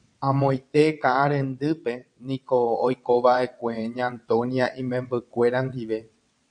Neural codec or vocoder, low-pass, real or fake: vocoder, 22.05 kHz, 80 mel bands, WaveNeXt; 9.9 kHz; fake